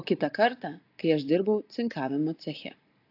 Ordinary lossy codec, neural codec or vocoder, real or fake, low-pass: AAC, 32 kbps; none; real; 5.4 kHz